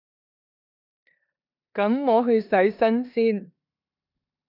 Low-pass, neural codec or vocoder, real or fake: 5.4 kHz; codec, 16 kHz in and 24 kHz out, 0.9 kbps, LongCat-Audio-Codec, four codebook decoder; fake